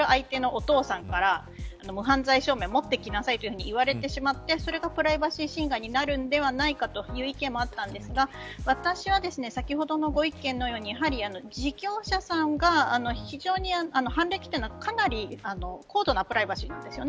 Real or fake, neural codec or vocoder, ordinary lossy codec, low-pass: real; none; none; none